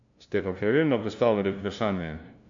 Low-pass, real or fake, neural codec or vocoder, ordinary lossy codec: 7.2 kHz; fake; codec, 16 kHz, 0.5 kbps, FunCodec, trained on LibriTTS, 25 frames a second; MP3, 64 kbps